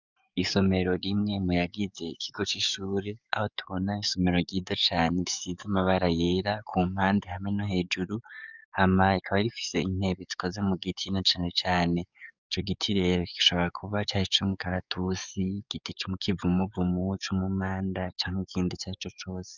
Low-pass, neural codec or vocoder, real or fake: 7.2 kHz; codec, 44.1 kHz, 7.8 kbps, DAC; fake